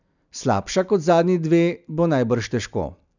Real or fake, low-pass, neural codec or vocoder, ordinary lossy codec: real; 7.2 kHz; none; none